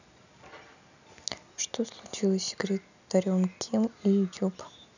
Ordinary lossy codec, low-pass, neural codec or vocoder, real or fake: none; 7.2 kHz; none; real